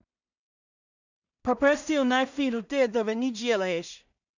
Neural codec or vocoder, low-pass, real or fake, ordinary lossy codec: codec, 16 kHz in and 24 kHz out, 0.4 kbps, LongCat-Audio-Codec, two codebook decoder; 7.2 kHz; fake; none